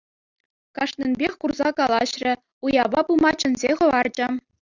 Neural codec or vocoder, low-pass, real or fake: none; 7.2 kHz; real